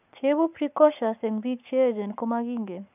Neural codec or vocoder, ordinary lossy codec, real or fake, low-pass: codec, 44.1 kHz, 7.8 kbps, Pupu-Codec; none; fake; 3.6 kHz